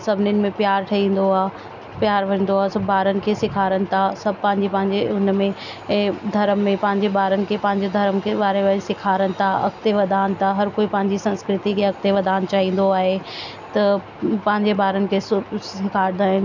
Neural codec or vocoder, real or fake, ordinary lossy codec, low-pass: none; real; none; 7.2 kHz